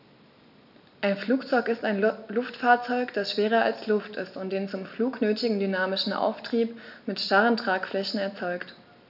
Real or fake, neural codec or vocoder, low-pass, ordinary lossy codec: real; none; 5.4 kHz; none